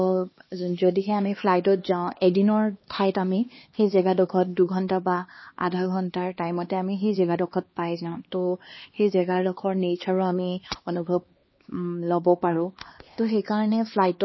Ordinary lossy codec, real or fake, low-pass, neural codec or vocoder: MP3, 24 kbps; fake; 7.2 kHz; codec, 16 kHz, 2 kbps, X-Codec, HuBERT features, trained on LibriSpeech